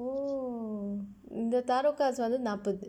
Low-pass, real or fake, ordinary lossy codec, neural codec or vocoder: 19.8 kHz; real; none; none